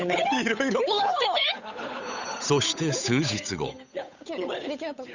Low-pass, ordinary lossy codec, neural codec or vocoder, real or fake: 7.2 kHz; none; codec, 16 kHz, 8 kbps, FunCodec, trained on Chinese and English, 25 frames a second; fake